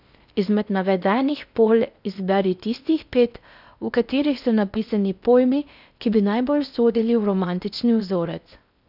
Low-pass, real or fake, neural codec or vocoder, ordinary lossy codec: 5.4 kHz; fake; codec, 16 kHz in and 24 kHz out, 0.6 kbps, FocalCodec, streaming, 4096 codes; none